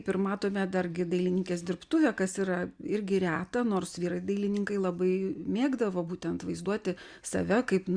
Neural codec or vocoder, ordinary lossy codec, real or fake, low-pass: none; Opus, 64 kbps; real; 9.9 kHz